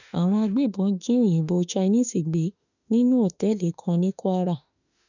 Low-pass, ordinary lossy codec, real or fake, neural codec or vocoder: 7.2 kHz; none; fake; autoencoder, 48 kHz, 32 numbers a frame, DAC-VAE, trained on Japanese speech